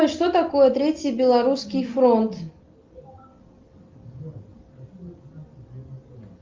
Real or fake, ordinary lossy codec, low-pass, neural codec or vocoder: real; Opus, 24 kbps; 7.2 kHz; none